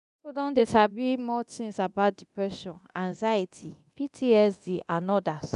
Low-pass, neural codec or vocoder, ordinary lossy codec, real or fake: 10.8 kHz; codec, 24 kHz, 0.9 kbps, DualCodec; none; fake